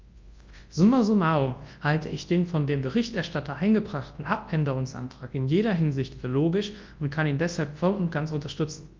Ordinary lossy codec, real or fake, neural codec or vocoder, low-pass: Opus, 32 kbps; fake; codec, 24 kHz, 0.9 kbps, WavTokenizer, large speech release; 7.2 kHz